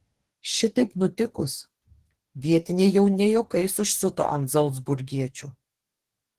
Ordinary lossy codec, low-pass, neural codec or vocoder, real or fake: Opus, 16 kbps; 14.4 kHz; codec, 44.1 kHz, 2.6 kbps, DAC; fake